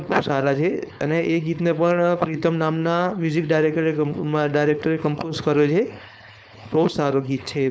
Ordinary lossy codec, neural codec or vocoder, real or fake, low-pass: none; codec, 16 kHz, 4.8 kbps, FACodec; fake; none